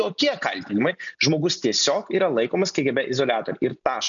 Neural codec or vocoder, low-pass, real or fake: none; 7.2 kHz; real